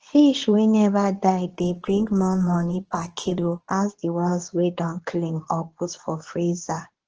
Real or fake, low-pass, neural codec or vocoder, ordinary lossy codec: fake; 7.2 kHz; codec, 24 kHz, 0.9 kbps, WavTokenizer, medium speech release version 2; Opus, 16 kbps